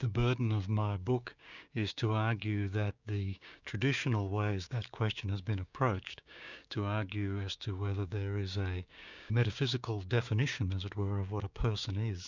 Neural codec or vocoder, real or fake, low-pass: codec, 16 kHz, 6 kbps, DAC; fake; 7.2 kHz